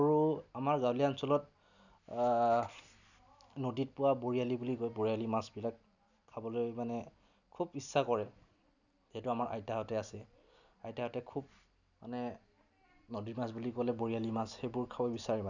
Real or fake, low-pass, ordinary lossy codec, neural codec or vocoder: real; 7.2 kHz; none; none